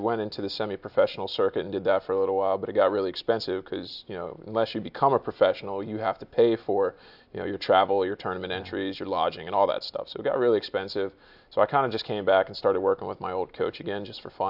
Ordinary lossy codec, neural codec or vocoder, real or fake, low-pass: AAC, 48 kbps; none; real; 5.4 kHz